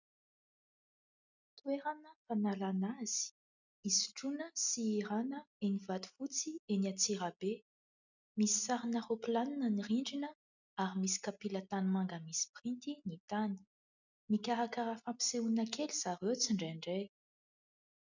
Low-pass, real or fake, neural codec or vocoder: 7.2 kHz; real; none